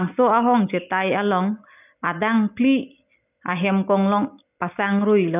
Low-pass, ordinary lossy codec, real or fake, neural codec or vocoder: 3.6 kHz; none; real; none